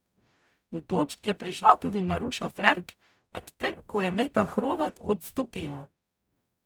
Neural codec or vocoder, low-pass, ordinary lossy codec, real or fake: codec, 44.1 kHz, 0.9 kbps, DAC; none; none; fake